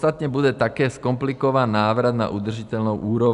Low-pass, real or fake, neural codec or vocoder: 10.8 kHz; real; none